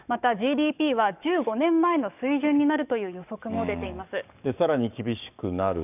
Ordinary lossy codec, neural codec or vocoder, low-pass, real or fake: none; codec, 44.1 kHz, 7.8 kbps, DAC; 3.6 kHz; fake